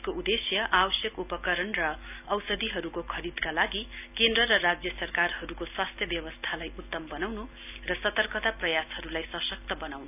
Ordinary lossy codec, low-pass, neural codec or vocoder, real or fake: none; 3.6 kHz; none; real